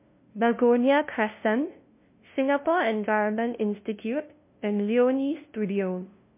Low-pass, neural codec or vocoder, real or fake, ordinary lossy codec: 3.6 kHz; codec, 16 kHz, 0.5 kbps, FunCodec, trained on LibriTTS, 25 frames a second; fake; MP3, 24 kbps